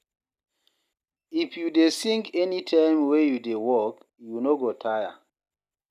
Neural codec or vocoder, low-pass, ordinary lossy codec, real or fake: none; 14.4 kHz; none; real